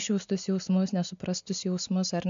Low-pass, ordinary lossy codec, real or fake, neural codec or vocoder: 7.2 kHz; MP3, 64 kbps; real; none